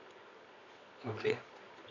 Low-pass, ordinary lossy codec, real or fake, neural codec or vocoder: 7.2 kHz; none; fake; codec, 24 kHz, 0.9 kbps, WavTokenizer, medium music audio release